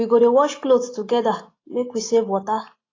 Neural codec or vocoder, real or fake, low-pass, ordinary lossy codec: none; real; 7.2 kHz; AAC, 32 kbps